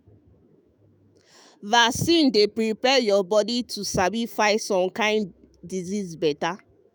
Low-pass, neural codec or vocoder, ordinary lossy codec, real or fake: none; autoencoder, 48 kHz, 128 numbers a frame, DAC-VAE, trained on Japanese speech; none; fake